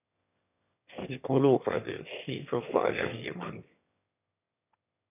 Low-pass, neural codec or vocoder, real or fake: 3.6 kHz; autoencoder, 22.05 kHz, a latent of 192 numbers a frame, VITS, trained on one speaker; fake